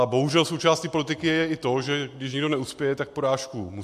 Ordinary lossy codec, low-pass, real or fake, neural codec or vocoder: MP3, 64 kbps; 14.4 kHz; real; none